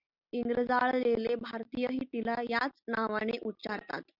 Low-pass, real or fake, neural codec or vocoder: 5.4 kHz; real; none